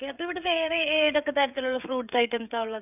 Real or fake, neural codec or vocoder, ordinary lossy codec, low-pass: fake; codec, 16 kHz, 16 kbps, FreqCodec, smaller model; none; 3.6 kHz